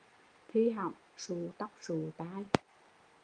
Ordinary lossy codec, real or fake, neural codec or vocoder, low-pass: Opus, 32 kbps; real; none; 9.9 kHz